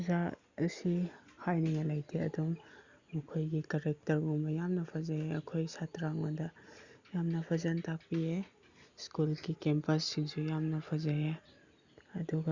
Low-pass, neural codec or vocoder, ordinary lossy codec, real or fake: 7.2 kHz; none; Opus, 64 kbps; real